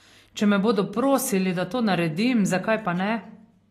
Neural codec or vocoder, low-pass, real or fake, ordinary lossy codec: none; 14.4 kHz; real; AAC, 48 kbps